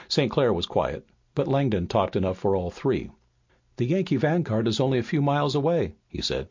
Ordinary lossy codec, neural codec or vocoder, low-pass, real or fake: MP3, 48 kbps; none; 7.2 kHz; real